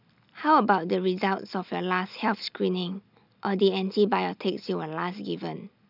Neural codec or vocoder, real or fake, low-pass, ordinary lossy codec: none; real; 5.4 kHz; none